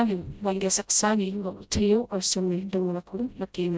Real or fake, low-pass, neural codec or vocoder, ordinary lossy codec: fake; none; codec, 16 kHz, 0.5 kbps, FreqCodec, smaller model; none